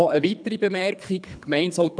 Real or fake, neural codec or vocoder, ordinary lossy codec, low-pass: fake; codec, 24 kHz, 3 kbps, HILCodec; none; 9.9 kHz